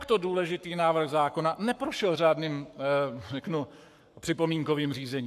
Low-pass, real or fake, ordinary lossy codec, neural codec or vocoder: 14.4 kHz; fake; AAC, 96 kbps; codec, 44.1 kHz, 7.8 kbps, Pupu-Codec